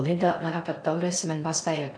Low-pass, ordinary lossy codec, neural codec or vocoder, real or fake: 9.9 kHz; MP3, 96 kbps; codec, 16 kHz in and 24 kHz out, 0.6 kbps, FocalCodec, streaming, 4096 codes; fake